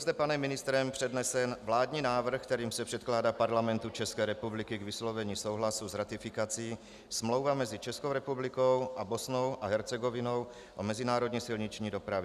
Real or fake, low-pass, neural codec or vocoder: real; 14.4 kHz; none